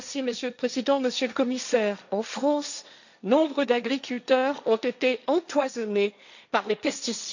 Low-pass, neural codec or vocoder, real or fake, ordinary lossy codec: 7.2 kHz; codec, 16 kHz, 1.1 kbps, Voila-Tokenizer; fake; none